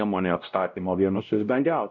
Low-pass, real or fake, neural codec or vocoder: 7.2 kHz; fake; codec, 16 kHz, 0.5 kbps, X-Codec, WavLM features, trained on Multilingual LibriSpeech